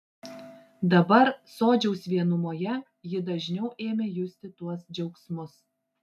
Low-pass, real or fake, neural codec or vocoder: 14.4 kHz; real; none